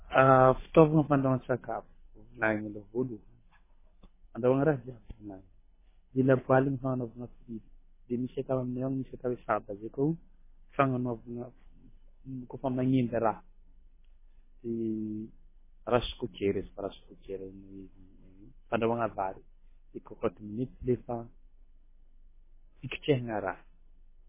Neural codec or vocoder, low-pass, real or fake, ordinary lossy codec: codec, 24 kHz, 6 kbps, HILCodec; 3.6 kHz; fake; MP3, 16 kbps